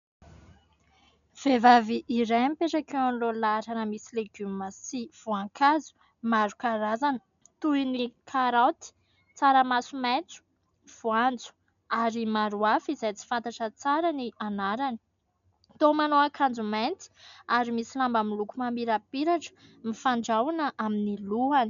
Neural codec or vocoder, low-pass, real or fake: none; 7.2 kHz; real